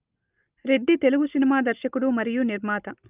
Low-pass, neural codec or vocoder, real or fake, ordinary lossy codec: 3.6 kHz; none; real; Opus, 24 kbps